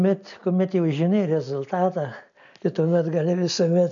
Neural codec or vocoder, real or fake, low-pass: none; real; 7.2 kHz